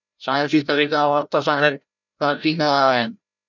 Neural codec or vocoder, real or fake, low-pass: codec, 16 kHz, 0.5 kbps, FreqCodec, larger model; fake; 7.2 kHz